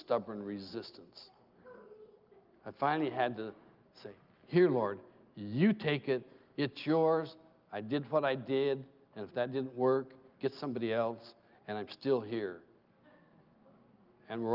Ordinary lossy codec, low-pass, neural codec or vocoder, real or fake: Opus, 24 kbps; 5.4 kHz; none; real